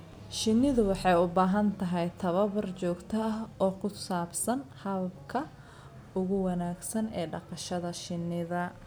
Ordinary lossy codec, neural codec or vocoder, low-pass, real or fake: none; none; none; real